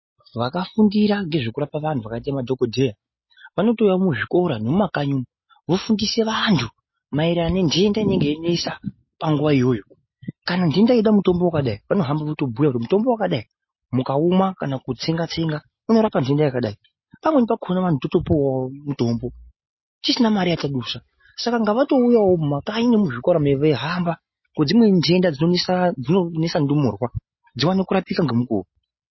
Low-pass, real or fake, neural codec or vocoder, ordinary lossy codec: 7.2 kHz; real; none; MP3, 24 kbps